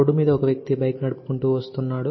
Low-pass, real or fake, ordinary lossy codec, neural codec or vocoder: 7.2 kHz; real; MP3, 24 kbps; none